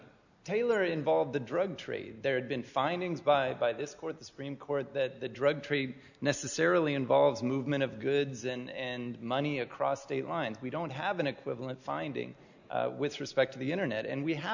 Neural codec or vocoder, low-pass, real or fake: none; 7.2 kHz; real